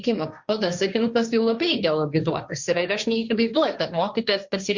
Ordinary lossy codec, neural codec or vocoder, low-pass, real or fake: Opus, 64 kbps; codec, 16 kHz, 1.1 kbps, Voila-Tokenizer; 7.2 kHz; fake